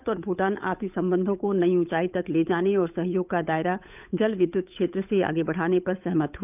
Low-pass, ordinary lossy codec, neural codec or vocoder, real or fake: 3.6 kHz; none; codec, 16 kHz, 8 kbps, FunCodec, trained on Chinese and English, 25 frames a second; fake